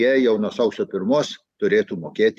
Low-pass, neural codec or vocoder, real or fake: 14.4 kHz; none; real